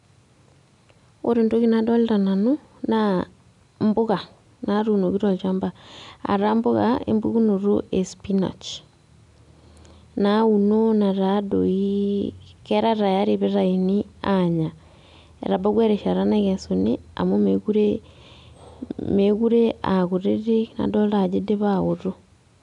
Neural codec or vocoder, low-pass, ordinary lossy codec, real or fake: none; 10.8 kHz; none; real